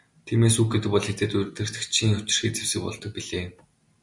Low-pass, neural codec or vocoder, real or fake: 10.8 kHz; none; real